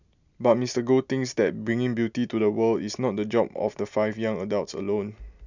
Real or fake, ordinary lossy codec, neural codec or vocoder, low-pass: real; none; none; 7.2 kHz